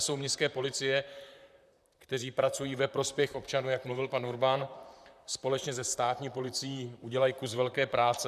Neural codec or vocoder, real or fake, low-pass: vocoder, 44.1 kHz, 128 mel bands, Pupu-Vocoder; fake; 14.4 kHz